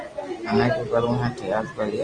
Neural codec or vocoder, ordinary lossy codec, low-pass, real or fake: none; Opus, 24 kbps; 9.9 kHz; real